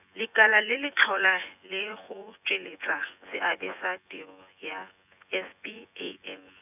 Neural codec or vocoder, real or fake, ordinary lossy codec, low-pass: vocoder, 24 kHz, 100 mel bands, Vocos; fake; none; 3.6 kHz